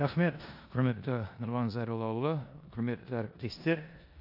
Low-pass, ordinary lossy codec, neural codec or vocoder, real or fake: 5.4 kHz; AAC, 48 kbps; codec, 16 kHz in and 24 kHz out, 0.9 kbps, LongCat-Audio-Codec, four codebook decoder; fake